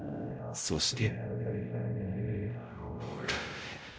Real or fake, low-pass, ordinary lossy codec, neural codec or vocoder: fake; none; none; codec, 16 kHz, 0.5 kbps, X-Codec, WavLM features, trained on Multilingual LibriSpeech